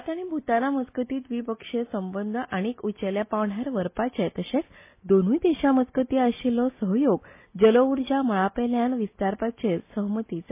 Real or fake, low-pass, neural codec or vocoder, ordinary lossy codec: real; 3.6 kHz; none; MP3, 24 kbps